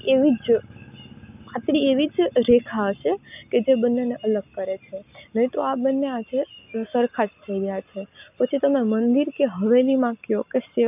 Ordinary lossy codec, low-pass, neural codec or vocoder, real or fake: none; 3.6 kHz; none; real